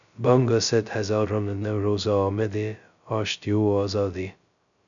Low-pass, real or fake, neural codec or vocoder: 7.2 kHz; fake; codec, 16 kHz, 0.2 kbps, FocalCodec